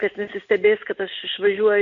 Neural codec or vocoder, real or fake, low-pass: none; real; 7.2 kHz